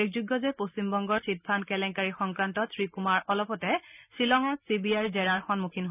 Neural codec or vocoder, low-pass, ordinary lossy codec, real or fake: none; 3.6 kHz; none; real